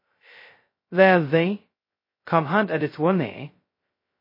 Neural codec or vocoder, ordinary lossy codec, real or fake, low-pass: codec, 16 kHz, 0.2 kbps, FocalCodec; MP3, 24 kbps; fake; 5.4 kHz